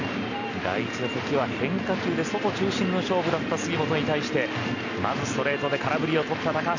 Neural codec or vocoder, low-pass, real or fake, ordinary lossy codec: none; 7.2 kHz; real; none